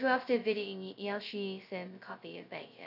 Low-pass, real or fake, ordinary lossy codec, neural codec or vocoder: 5.4 kHz; fake; none; codec, 16 kHz, 0.2 kbps, FocalCodec